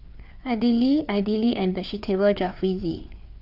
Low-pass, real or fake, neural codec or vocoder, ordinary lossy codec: 5.4 kHz; fake; codec, 16 kHz, 4 kbps, FreqCodec, larger model; none